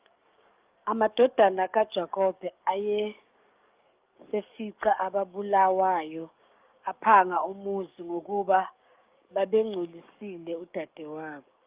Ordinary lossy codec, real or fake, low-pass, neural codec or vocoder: Opus, 24 kbps; fake; 3.6 kHz; codec, 44.1 kHz, 7.8 kbps, DAC